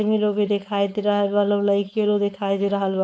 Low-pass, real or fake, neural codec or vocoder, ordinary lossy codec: none; fake; codec, 16 kHz, 4.8 kbps, FACodec; none